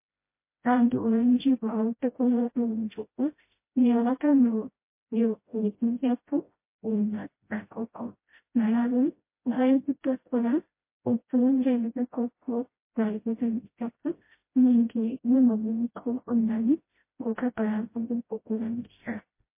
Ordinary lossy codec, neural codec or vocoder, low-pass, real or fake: MP3, 24 kbps; codec, 16 kHz, 0.5 kbps, FreqCodec, smaller model; 3.6 kHz; fake